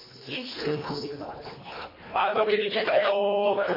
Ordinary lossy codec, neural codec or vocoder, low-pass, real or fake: MP3, 24 kbps; codec, 24 kHz, 1.5 kbps, HILCodec; 5.4 kHz; fake